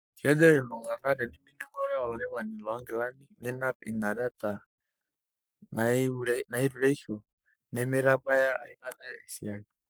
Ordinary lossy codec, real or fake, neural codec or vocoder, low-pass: none; fake; codec, 44.1 kHz, 3.4 kbps, Pupu-Codec; none